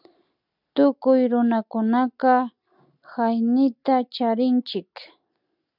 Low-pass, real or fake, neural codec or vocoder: 5.4 kHz; real; none